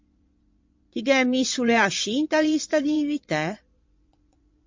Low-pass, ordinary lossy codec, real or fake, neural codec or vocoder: 7.2 kHz; MP3, 48 kbps; fake; vocoder, 22.05 kHz, 80 mel bands, Vocos